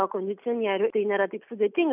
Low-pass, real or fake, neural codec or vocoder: 3.6 kHz; real; none